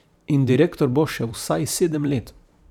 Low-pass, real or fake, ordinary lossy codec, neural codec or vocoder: 19.8 kHz; fake; none; vocoder, 44.1 kHz, 128 mel bands every 512 samples, BigVGAN v2